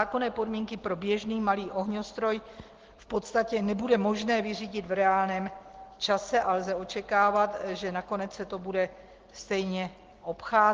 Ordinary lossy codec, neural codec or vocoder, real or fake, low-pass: Opus, 16 kbps; none; real; 7.2 kHz